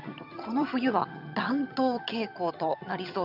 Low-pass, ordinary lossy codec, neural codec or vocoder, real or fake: 5.4 kHz; none; vocoder, 22.05 kHz, 80 mel bands, HiFi-GAN; fake